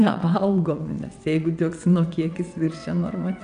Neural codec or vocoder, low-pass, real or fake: vocoder, 22.05 kHz, 80 mel bands, WaveNeXt; 9.9 kHz; fake